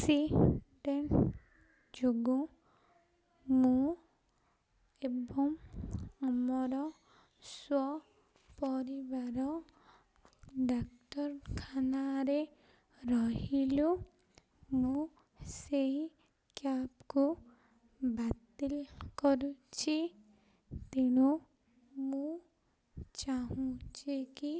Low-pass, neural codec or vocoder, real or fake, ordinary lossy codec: none; none; real; none